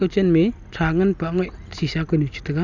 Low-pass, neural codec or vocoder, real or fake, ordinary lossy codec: 7.2 kHz; none; real; none